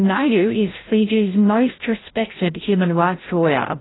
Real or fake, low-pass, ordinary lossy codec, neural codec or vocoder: fake; 7.2 kHz; AAC, 16 kbps; codec, 16 kHz, 0.5 kbps, FreqCodec, larger model